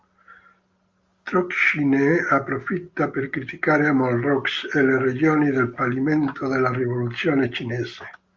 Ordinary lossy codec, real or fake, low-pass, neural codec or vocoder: Opus, 32 kbps; real; 7.2 kHz; none